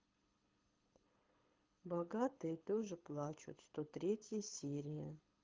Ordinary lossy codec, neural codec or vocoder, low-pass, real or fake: Opus, 32 kbps; codec, 24 kHz, 6 kbps, HILCodec; 7.2 kHz; fake